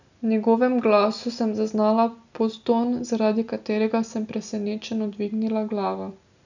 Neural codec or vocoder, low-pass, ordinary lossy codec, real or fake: none; 7.2 kHz; none; real